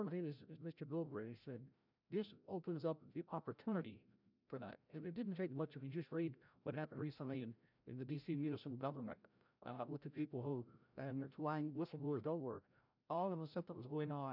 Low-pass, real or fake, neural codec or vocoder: 5.4 kHz; fake; codec, 16 kHz, 0.5 kbps, FreqCodec, larger model